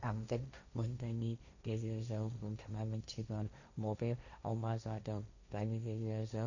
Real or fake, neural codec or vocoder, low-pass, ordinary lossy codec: fake; codec, 16 kHz, 1.1 kbps, Voila-Tokenizer; 7.2 kHz; none